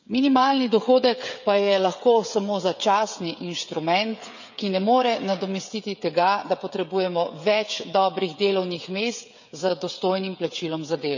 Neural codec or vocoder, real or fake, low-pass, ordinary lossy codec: codec, 16 kHz, 16 kbps, FreqCodec, smaller model; fake; 7.2 kHz; none